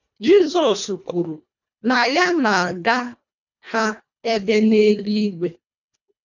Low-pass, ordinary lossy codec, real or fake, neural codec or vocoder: 7.2 kHz; none; fake; codec, 24 kHz, 1.5 kbps, HILCodec